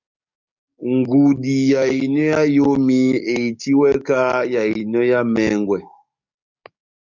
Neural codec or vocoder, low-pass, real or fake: codec, 44.1 kHz, 7.8 kbps, DAC; 7.2 kHz; fake